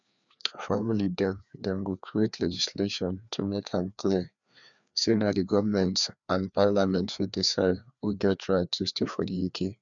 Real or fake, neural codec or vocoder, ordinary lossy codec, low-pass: fake; codec, 16 kHz, 2 kbps, FreqCodec, larger model; none; 7.2 kHz